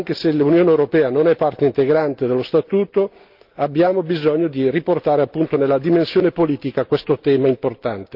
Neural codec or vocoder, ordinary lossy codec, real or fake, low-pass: none; Opus, 32 kbps; real; 5.4 kHz